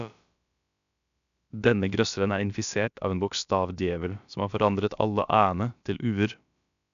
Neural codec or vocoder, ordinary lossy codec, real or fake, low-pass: codec, 16 kHz, about 1 kbps, DyCAST, with the encoder's durations; none; fake; 7.2 kHz